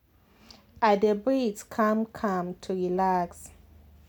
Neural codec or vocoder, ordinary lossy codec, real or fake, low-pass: none; none; real; none